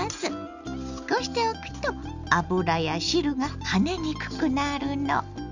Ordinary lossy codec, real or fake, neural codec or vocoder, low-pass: none; real; none; 7.2 kHz